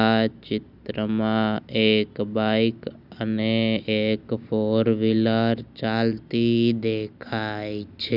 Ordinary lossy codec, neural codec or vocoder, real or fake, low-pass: none; none; real; 5.4 kHz